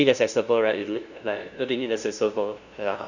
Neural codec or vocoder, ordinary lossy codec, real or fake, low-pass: codec, 16 kHz, 0.5 kbps, FunCodec, trained on LibriTTS, 25 frames a second; none; fake; 7.2 kHz